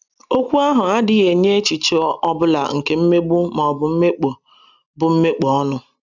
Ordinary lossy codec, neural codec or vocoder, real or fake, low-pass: none; none; real; 7.2 kHz